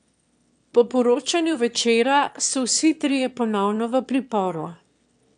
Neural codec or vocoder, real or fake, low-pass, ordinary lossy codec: autoencoder, 22.05 kHz, a latent of 192 numbers a frame, VITS, trained on one speaker; fake; 9.9 kHz; none